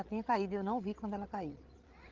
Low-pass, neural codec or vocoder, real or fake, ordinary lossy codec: 7.2 kHz; codec, 16 kHz, 16 kbps, FreqCodec, larger model; fake; Opus, 16 kbps